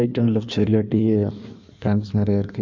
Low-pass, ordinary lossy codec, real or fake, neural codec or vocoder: 7.2 kHz; MP3, 64 kbps; fake; codec, 16 kHz in and 24 kHz out, 1.1 kbps, FireRedTTS-2 codec